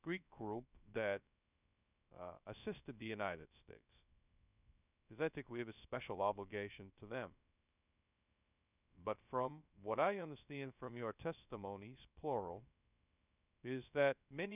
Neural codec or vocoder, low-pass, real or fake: codec, 16 kHz, 0.2 kbps, FocalCodec; 3.6 kHz; fake